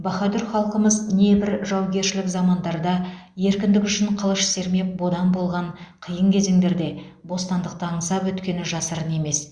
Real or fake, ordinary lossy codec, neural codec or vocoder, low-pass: real; Opus, 64 kbps; none; 9.9 kHz